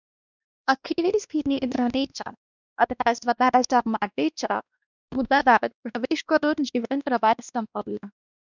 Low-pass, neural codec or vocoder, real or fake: 7.2 kHz; codec, 16 kHz, 1 kbps, X-Codec, WavLM features, trained on Multilingual LibriSpeech; fake